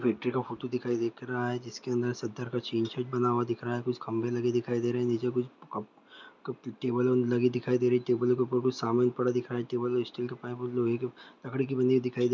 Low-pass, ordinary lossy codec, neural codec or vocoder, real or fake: 7.2 kHz; none; none; real